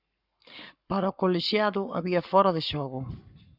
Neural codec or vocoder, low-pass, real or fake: codec, 44.1 kHz, 7.8 kbps, Pupu-Codec; 5.4 kHz; fake